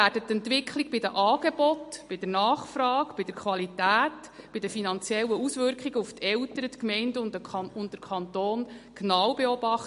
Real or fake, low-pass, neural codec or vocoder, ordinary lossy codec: real; 10.8 kHz; none; MP3, 48 kbps